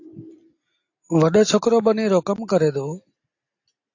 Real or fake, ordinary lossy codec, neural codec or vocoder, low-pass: real; AAC, 48 kbps; none; 7.2 kHz